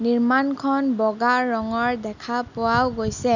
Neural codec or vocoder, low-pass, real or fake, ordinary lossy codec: none; 7.2 kHz; real; none